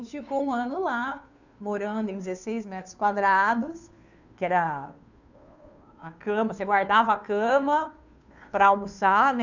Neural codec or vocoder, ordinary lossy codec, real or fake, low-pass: codec, 16 kHz, 2 kbps, FunCodec, trained on Chinese and English, 25 frames a second; none; fake; 7.2 kHz